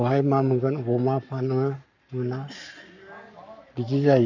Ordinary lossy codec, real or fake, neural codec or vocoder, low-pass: none; fake; codec, 44.1 kHz, 7.8 kbps, Pupu-Codec; 7.2 kHz